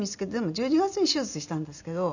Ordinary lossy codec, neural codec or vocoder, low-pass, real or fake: none; none; 7.2 kHz; real